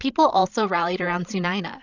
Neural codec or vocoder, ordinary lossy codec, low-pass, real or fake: codec, 16 kHz, 16 kbps, FreqCodec, larger model; Opus, 64 kbps; 7.2 kHz; fake